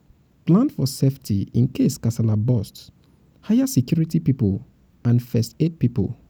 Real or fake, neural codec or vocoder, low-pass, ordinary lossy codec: real; none; none; none